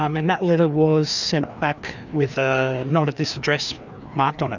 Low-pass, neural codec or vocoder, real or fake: 7.2 kHz; codec, 16 kHz, 2 kbps, FreqCodec, larger model; fake